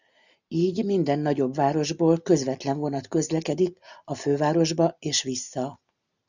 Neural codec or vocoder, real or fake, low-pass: none; real; 7.2 kHz